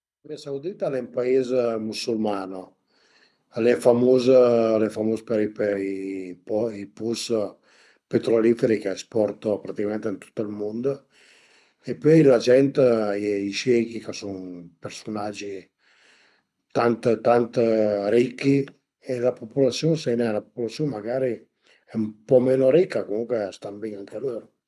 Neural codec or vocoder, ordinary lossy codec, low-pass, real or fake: codec, 24 kHz, 6 kbps, HILCodec; none; none; fake